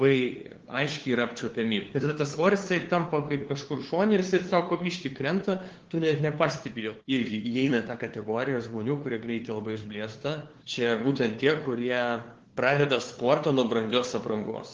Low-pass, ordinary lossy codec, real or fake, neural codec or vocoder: 7.2 kHz; Opus, 16 kbps; fake; codec, 16 kHz, 2 kbps, FunCodec, trained on LibriTTS, 25 frames a second